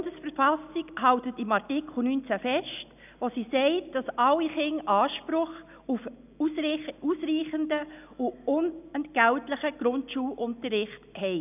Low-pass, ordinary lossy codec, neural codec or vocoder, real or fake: 3.6 kHz; none; none; real